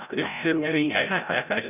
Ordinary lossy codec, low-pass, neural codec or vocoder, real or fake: none; 3.6 kHz; codec, 16 kHz, 0.5 kbps, FreqCodec, larger model; fake